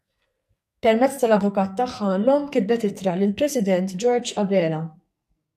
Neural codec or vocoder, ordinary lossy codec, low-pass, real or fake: codec, 44.1 kHz, 2.6 kbps, SNAC; AAC, 96 kbps; 14.4 kHz; fake